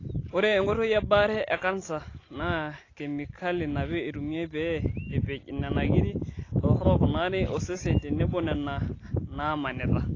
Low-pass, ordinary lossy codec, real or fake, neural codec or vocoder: 7.2 kHz; AAC, 32 kbps; real; none